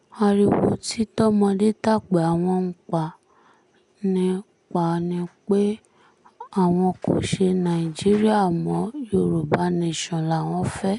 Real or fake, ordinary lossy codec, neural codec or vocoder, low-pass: real; none; none; 10.8 kHz